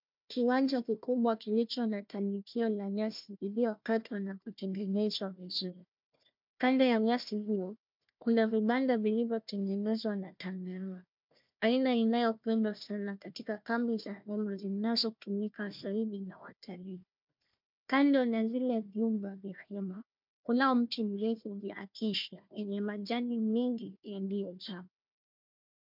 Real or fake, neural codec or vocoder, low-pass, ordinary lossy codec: fake; codec, 16 kHz, 1 kbps, FunCodec, trained on Chinese and English, 50 frames a second; 5.4 kHz; MP3, 48 kbps